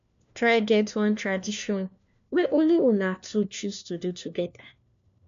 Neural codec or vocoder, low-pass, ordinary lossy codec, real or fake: codec, 16 kHz, 1 kbps, FunCodec, trained on LibriTTS, 50 frames a second; 7.2 kHz; MP3, 64 kbps; fake